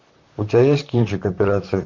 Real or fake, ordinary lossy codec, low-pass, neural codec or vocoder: fake; MP3, 64 kbps; 7.2 kHz; vocoder, 44.1 kHz, 128 mel bands, Pupu-Vocoder